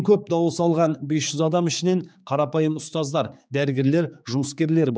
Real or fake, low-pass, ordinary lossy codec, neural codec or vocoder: fake; none; none; codec, 16 kHz, 4 kbps, X-Codec, HuBERT features, trained on general audio